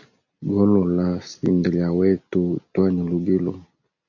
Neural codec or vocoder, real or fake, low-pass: none; real; 7.2 kHz